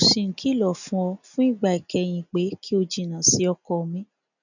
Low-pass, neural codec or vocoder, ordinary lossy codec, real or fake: 7.2 kHz; none; none; real